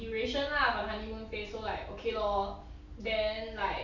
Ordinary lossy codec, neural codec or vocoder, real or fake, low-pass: none; none; real; 7.2 kHz